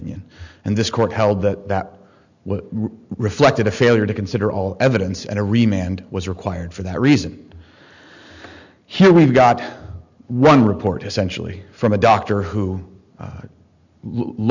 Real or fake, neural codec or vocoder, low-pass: real; none; 7.2 kHz